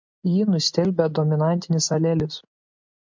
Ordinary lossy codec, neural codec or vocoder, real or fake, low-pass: MP3, 64 kbps; none; real; 7.2 kHz